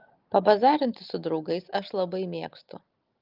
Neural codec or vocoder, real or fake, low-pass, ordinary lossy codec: none; real; 5.4 kHz; Opus, 32 kbps